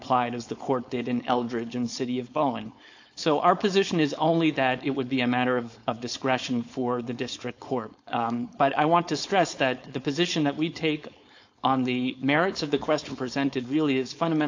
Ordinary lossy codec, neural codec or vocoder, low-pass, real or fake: AAC, 48 kbps; codec, 16 kHz, 4.8 kbps, FACodec; 7.2 kHz; fake